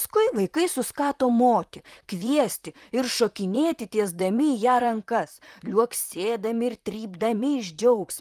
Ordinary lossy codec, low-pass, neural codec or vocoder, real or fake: Opus, 32 kbps; 14.4 kHz; vocoder, 44.1 kHz, 128 mel bands, Pupu-Vocoder; fake